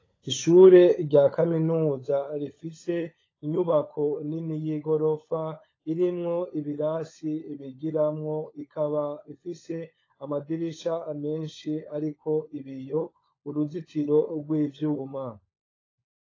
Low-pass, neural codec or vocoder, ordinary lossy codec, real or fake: 7.2 kHz; codec, 16 kHz, 16 kbps, FunCodec, trained on LibriTTS, 50 frames a second; AAC, 32 kbps; fake